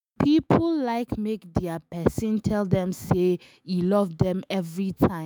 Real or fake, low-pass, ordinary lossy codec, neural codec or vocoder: fake; none; none; autoencoder, 48 kHz, 128 numbers a frame, DAC-VAE, trained on Japanese speech